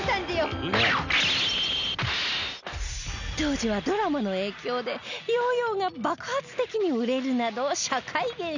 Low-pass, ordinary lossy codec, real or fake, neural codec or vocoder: 7.2 kHz; none; real; none